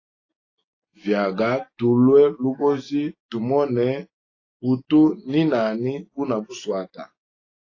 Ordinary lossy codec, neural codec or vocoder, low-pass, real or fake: AAC, 32 kbps; none; 7.2 kHz; real